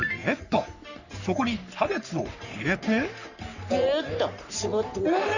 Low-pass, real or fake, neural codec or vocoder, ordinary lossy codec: 7.2 kHz; fake; codec, 44.1 kHz, 3.4 kbps, Pupu-Codec; none